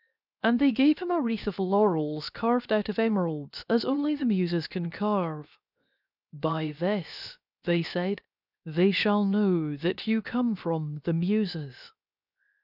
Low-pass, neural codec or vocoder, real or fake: 5.4 kHz; codec, 16 kHz, 0.7 kbps, FocalCodec; fake